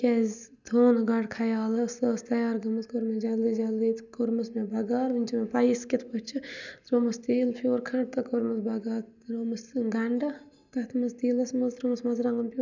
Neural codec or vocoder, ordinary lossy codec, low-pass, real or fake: none; none; 7.2 kHz; real